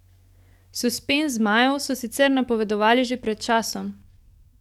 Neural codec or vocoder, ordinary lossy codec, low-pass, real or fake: codec, 44.1 kHz, 7.8 kbps, DAC; none; 19.8 kHz; fake